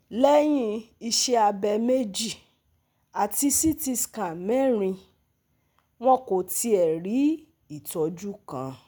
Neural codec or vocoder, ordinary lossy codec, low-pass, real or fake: none; none; none; real